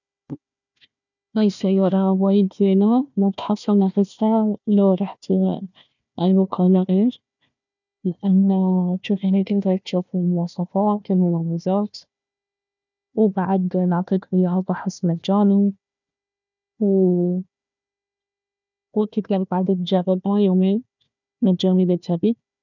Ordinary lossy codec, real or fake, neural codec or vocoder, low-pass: none; fake; codec, 16 kHz, 1 kbps, FunCodec, trained on Chinese and English, 50 frames a second; 7.2 kHz